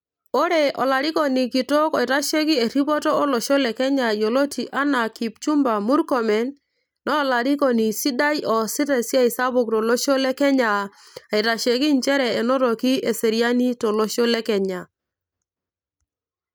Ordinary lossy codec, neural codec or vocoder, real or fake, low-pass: none; none; real; none